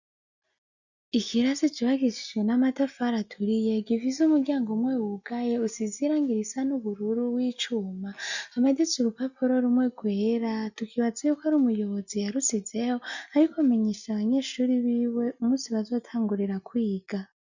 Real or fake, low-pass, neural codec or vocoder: real; 7.2 kHz; none